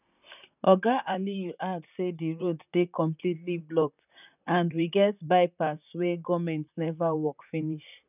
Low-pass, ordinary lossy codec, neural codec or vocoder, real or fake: 3.6 kHz; none; vocoder, 44.1 kHz, 128 mel bands, Pupu-Vocoder; fake